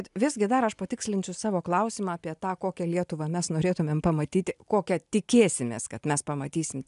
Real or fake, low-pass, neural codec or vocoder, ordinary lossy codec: real; 10.8 kHz; none; MP3, 96 kbps